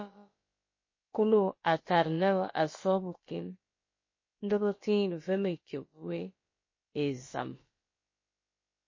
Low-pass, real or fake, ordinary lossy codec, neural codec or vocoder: 7.2 kHz; fake; MP3, 32 kbps; codec, 16 kHz, about 1 kbps, DyCAST, with the encoder's durations